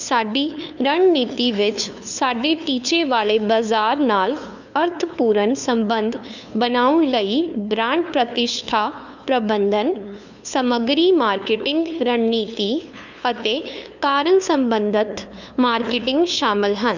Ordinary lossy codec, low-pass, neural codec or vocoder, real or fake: none; 7.2 kHz; codec, 16 kHz, 2 kbps, FunCodec, trained on LibriTTS, 25 frames a second; fake